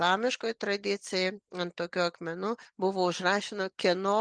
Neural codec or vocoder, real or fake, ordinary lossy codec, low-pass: none; real; Opus, 32 kbps; 9.9 kHz